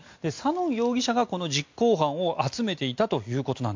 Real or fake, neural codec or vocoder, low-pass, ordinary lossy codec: real; none; 7.2 kHz; MP3, 48 kbps